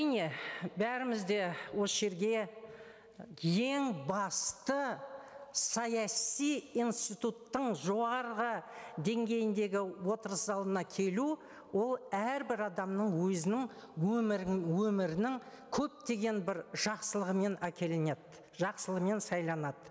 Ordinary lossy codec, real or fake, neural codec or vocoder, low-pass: none; real; none; none